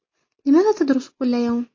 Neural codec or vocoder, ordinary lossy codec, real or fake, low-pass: none; MP3, 32 kbps; real; 7.2 kHz